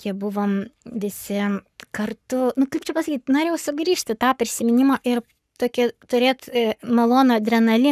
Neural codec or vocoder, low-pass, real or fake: codec, 44.1 kHz, 7.8 kbps, Pupu-Codec; 14.4 kHz; fake